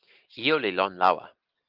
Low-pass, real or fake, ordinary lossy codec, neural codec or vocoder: 5.4 kHz; real; Opus, 32 kbps; none